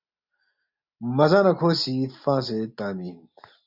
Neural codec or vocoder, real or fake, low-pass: none; real; 5.4 kHz